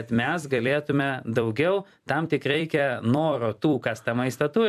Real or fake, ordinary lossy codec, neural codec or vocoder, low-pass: fake; AAC, 96 kbps; vocoder, 44.1 kHz, 128 mel bands every 256 samples, BigVGAN v2; 14.4 kHz